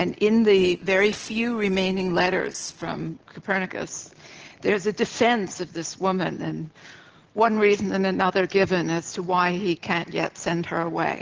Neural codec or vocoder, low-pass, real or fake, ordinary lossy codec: none; 7.2 kHz; real; Opus, 16 kbps